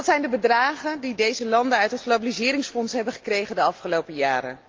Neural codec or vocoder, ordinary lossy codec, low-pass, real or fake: none; Opus, 32 kbps; 7.2 kHz; real